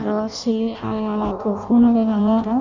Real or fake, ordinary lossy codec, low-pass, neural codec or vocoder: fake; none; 7.2 kHz; codec, 16 kHz in and 24 kHz out, 0.6 kbps, FireRedTTS-2 codec